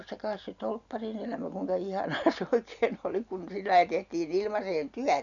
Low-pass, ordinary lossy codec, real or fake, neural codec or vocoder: 7.2 kHz; none; real; none